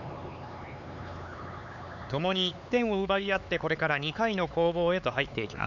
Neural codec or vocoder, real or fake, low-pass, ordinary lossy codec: codec, 16 kHz, 4 kbps, X-Codec, HuBERT features, trained on LibriSpeech; fake; 7.2 kHz; none